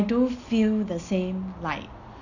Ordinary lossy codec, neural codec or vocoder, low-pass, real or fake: none; none; 7.2 kHz; real